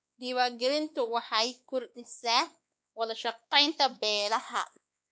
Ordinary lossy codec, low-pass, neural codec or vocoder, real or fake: none; none; codec, 16 kHz, 2 kbps, X-Codec, WavLM features, trained on Multilingual LibriSpeech; fake